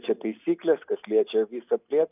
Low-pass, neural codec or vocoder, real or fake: 3.6 kHz; none; real